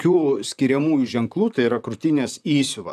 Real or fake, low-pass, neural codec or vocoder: fake; 14.4 kHz; vocoder, 44.1 kHz, 128 mel bands, Pupu-Vocoder